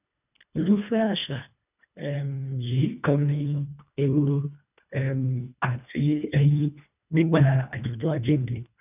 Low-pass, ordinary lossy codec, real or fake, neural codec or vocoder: 3.6 kHz; none; fake; codec, 24 kHz, 1.5 kbps, HILCodec